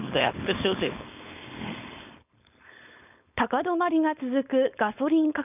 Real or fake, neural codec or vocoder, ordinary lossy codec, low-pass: fake; codec, 16 kHz, 4.8 kbps, FACodec; none; 3.6 kHz